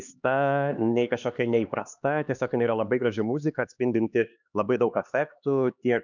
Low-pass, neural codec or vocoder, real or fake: 7.2 kHz; codec, 16 kHz, 2 kbps, X-Codec, HuBERT features, trained on LibriSpeech; fake